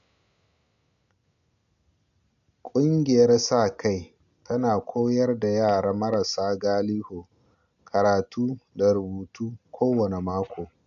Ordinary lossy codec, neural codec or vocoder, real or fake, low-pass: none; none; real; 7.2 kHz